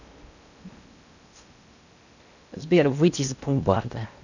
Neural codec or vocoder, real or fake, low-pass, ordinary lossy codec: codec, 16 kHz in and 24 kHz out, 0.8 kbps, FocalCodec, streaming, 65536 codes; fake; 7.2 kHz; none